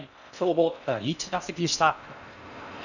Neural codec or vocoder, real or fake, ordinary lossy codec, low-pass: codec, 16 kHz in and 24 kHz out, 0.6 kbps, FocalCodec, streaming, 4096 codes; fake; none; 7.2 kHz